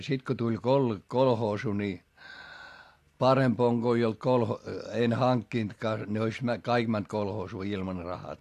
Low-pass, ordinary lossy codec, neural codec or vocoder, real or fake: 14.4 kHz; AAC, 64 kbps; none; real